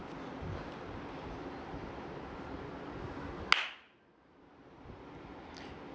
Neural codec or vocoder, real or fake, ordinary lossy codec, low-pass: none; real; none; none